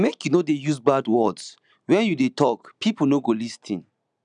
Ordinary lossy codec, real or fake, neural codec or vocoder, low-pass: none; real; none; 9.9 kHz